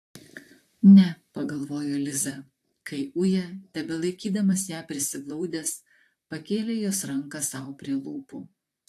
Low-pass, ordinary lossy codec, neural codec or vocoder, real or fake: 14.4 kHz; AAC, 64 kbps; vocoder, 44.1 kHz, 128 mel bands, Pupu-Vocoder; fake